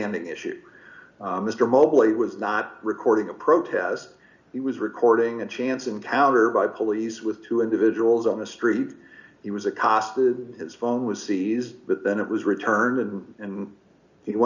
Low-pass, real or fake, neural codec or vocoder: 7.2 kHz; real; none